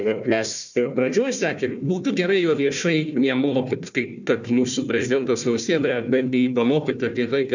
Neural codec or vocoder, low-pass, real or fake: codec, 16 kHz, 1 kbps, FunCodec, trained on Chinese and English, 50 frames a second; 7.2 kHz; fake